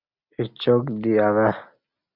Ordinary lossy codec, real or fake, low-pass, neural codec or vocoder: Opus, 64 kbps; real; 5.4 kHz; none